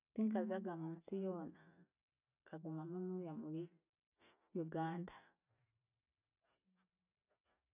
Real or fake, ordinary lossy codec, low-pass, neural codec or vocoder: real; none; 3.6 kHz; none